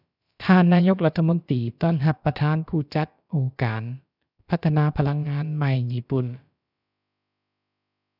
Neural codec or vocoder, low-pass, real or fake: codec, 16 kHz, about 1 kbps, DyCAST, with the encoder's durations; 5.4 kHz; fake